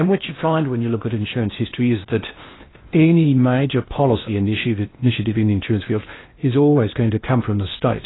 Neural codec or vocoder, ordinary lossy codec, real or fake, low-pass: codec, 16 kHz in and 24 kHz out, 0.6 kbps, FocalCodec, streaming, 4096 codes; AAC, 16 kbps; fake; 7.2 kHz